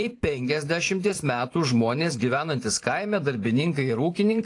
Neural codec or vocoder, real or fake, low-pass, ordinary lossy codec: vocoder, 44.1 kHz, 128 mel bands every 512 samples, BigVGAN v2; fake; 10.8 kHz; AAC, 48 kbps